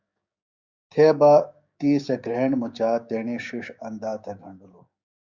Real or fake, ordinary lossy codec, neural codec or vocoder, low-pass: fake; Opus, 64 kbps; codec, 16 kHz, 6 kbps, DAC; 7.2 kHz